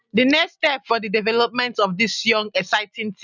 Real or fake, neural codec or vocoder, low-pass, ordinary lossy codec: real; none; 7.2 kHz; none